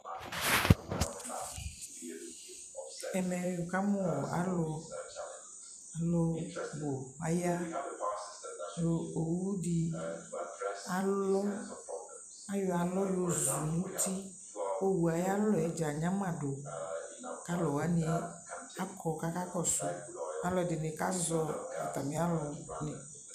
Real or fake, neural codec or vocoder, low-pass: fake; vocoder, 44.1 kHz, 128 mel bands every 256 samples, BigVGAN v2; 14.4 kHz